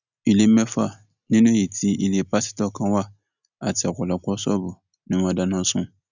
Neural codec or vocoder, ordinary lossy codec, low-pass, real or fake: none; none; 7.2 kHz; real